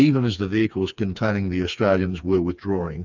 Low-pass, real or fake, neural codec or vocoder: 7.2 kHz; fake; codec, 16 kHz, 4 kbps, FreqCodec, smaller model